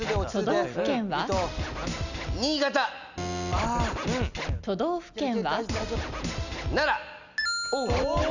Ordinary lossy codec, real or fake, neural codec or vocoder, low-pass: none; real; none; 7.2 kHz